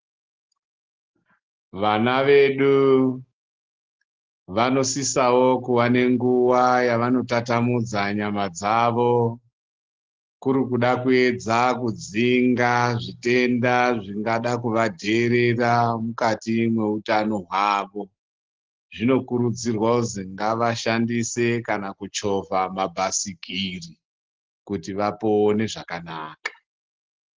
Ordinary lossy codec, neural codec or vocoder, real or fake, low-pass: Opus, 16 kbps; none; real; 7.2 kHz